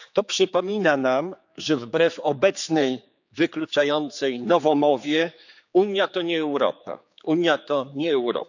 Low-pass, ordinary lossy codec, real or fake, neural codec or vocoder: 7.2 kHz; none; fake; codec, 16 kHz, 4 kbps, X-Codec, HuBERT features, trained on general audio